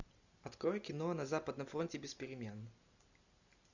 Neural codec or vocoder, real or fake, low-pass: none; real; 7.2 kHz